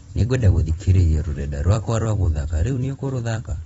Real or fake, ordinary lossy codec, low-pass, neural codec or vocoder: fake; AAC, 24 kbps; 19.8 kHz; vocoder, 44.1 kHz, 128 mel bands every 256 samples, BigVGAN v2